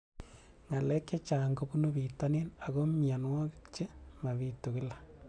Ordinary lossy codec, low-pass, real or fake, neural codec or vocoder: none; 9.9 kHz; real; none